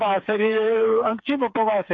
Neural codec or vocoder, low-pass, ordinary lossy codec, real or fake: codec, 16 kHz, 4 kbps, FreqCodec, smaller model; 7.2 kHz; AAC, 48 kbps; fake